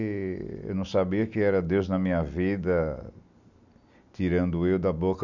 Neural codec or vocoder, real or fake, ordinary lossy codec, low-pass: none; real; none; 7.2 kHz